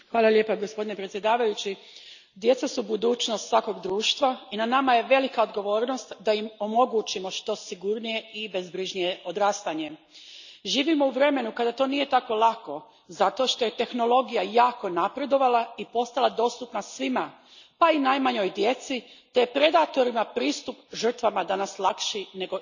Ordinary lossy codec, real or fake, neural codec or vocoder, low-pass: none; real; none; 7.2 kHz